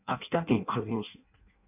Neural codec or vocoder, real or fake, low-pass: codec, 16 kHz in and 24 kHz out, 0.6 kbps, FireRedTTS-2 codec; fake; 3.6 kHz